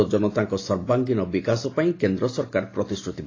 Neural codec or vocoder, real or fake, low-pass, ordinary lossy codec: none; real; 7.2 kHz; AAC, 32 kbps